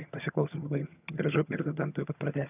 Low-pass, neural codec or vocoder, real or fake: 3.6 kHz; vocoder, 22.05 kHz, 80 mel bands, HiFi-GAN; fake